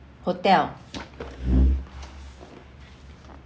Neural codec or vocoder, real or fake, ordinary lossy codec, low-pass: none; real; none; none